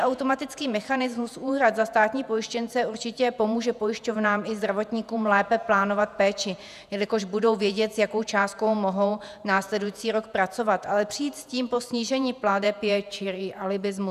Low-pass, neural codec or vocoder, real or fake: 14.4 kHz; vocoder, 48 kHz, 128 mel bands, Vocos; fake